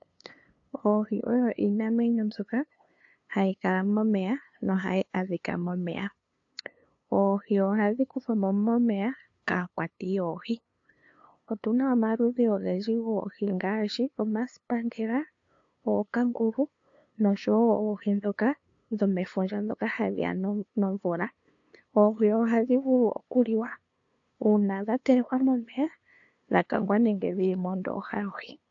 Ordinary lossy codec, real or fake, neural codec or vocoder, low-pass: AAC, 48 kbps; fake; codec, 16 kHz, 2 kbps, FunCodec, trained on LibriTTS, 25 frames a second; 7.2 kHz